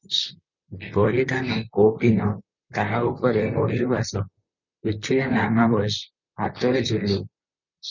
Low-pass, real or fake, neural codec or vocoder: 7.2 kHz; fake; vocoder, 44.1 kHz, 128 mel bands, Pupu-Vocoder